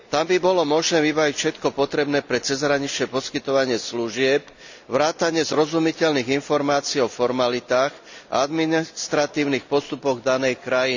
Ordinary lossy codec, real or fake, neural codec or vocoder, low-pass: none; real; none; 7.2 kHz